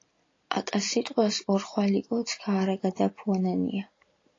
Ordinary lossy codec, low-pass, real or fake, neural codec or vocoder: AAC, 32 kbps; 7.2 kHz; real; none